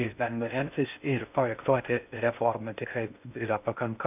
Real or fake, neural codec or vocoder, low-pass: fake; codec, 16 kHz in and 24 kHz out, 0.6 kbps, FocalCodec, streaming, 4096 codes; 3.6 kHz